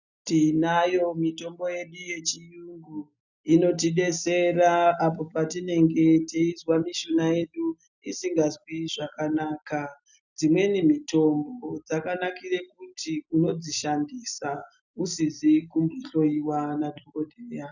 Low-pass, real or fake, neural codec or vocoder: 7.2 kHz; real; none